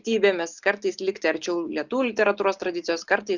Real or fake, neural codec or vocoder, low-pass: real; none; 7.2 kHz